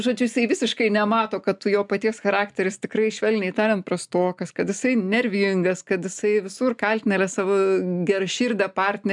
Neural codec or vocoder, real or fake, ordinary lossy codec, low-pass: none; real; MP3, 96 kbps; 10.8 kHz